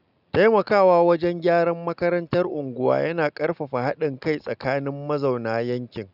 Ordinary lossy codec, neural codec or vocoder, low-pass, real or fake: none; none; 5.4 kHz; real